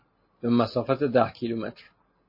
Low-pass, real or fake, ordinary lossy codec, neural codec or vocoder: 5.4 kHz; real; MP3, 24 kbps; none